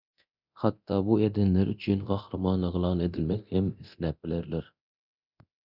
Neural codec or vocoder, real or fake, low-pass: codec, 24 kHz, 0.9 kbps, DualCodec; fake; 5.4 kHz